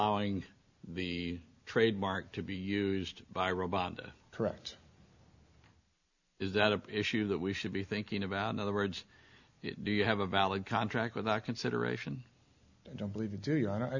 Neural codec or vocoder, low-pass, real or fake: none; 7.2 kHz; real